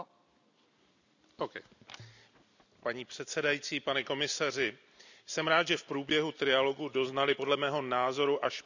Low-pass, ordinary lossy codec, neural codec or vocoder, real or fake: 7.2 kHz; none; none; real